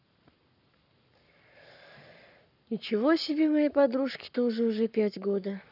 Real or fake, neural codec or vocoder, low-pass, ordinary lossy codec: fake; codec, 44.1 kHz, 7.8 kbps, Pupu-Codec; 5.4 kHz; none